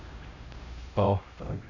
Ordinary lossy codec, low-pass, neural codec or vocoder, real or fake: none; 7.2 kHz; codec, 16 kHz, 0.5 kbps, X-Codec, HuBERT features, trained on LibriSpeech; fake